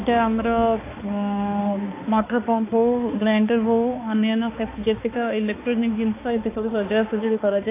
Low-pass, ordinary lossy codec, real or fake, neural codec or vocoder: 3.6 kHz; AAC, 24 kbps; fake; codec, 16 kHz, 2 kbps, X-Codec, HuBERT features, trained on balanced general audio